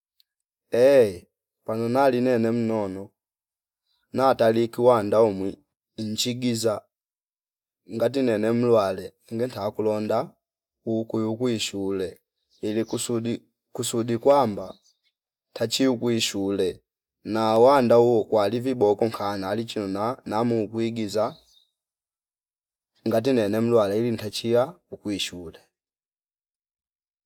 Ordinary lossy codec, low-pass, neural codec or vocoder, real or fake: none; 19.8 kHz; none; real